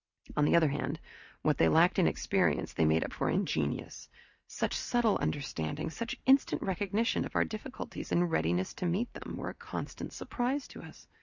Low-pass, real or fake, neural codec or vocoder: 7.2 kHz; real; none